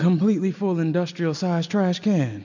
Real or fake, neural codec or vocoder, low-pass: real; none; 7.2 kHz